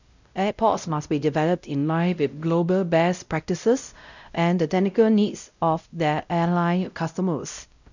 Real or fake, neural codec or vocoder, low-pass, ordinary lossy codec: fake; codec, 16 kHz, 0.5 kbps, X-Codec, WavLM features, trained on Multilingual LibriSpeech; 7.2 kHz; none